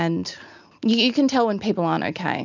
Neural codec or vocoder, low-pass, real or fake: none; 7.2 kHz; real